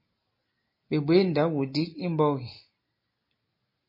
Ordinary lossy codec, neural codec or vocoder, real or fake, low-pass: MP3, 24 kbps; none; real; 5.4 kHz